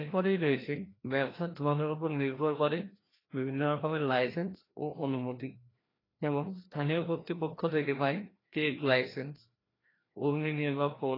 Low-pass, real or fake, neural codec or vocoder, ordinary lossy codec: 5.4 kHz; fake; codec, 16 kHz, 1 kbps, FreqCodec, larger model; AAC, 24 kbps